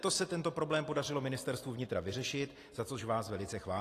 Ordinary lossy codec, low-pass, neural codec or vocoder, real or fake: AAC, 48 kbps; 14.4 kHz; none; real